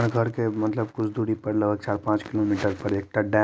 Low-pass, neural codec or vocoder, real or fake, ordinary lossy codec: none; none; real; none